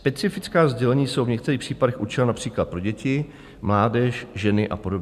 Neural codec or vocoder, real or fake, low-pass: none; real; 14.4 kHz